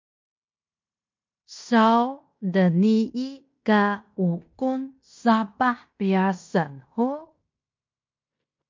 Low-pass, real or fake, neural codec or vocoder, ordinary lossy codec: 7.2 kHz; fake; codec, 16 kHz in and 24 kHz out, 0.9 kbps, LongCat-Audio-Codec, fine tuned four codebook decoder; MP3, 48 kbps